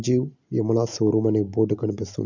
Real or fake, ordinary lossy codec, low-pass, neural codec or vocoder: real; none; 7.2 kHz; none